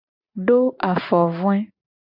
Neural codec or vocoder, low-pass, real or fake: vocoder, 44.1 kHz, 128 mel bands every 512 samples, BigVGAN v2; 5.4 kHz; fake